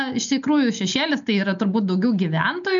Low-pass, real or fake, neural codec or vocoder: 7.2 kHz; real; none